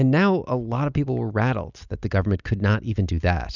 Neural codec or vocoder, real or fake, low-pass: none; real; 7.2 kHz